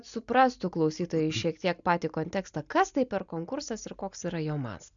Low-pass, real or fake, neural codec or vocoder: 7.2 kHz; real; none